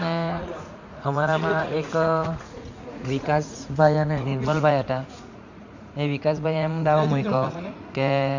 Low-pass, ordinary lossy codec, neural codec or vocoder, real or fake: 7.2 kHz; Opus, 64 kbps; vocoder, 44.1 kHz, 80 mel bands, Vocos; fake